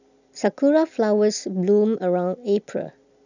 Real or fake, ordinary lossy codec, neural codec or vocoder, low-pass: real; none; none; 7.2 kHz